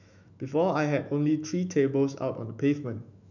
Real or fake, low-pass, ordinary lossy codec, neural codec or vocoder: fake; 7.2 kHz; none; autoencoder, 48 kHz, 128 numbers a frame, DAC-VAE, trained on Japanese speech